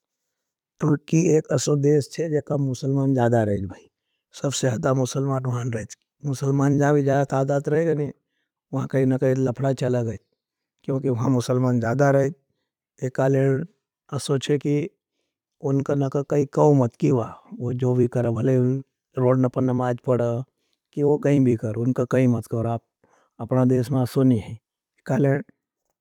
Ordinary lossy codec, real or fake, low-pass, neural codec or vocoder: none; fake; 19.8 kHz; vocoder, 44.1 kHz, 128 mel bands every 256 samples, BigVGAN v2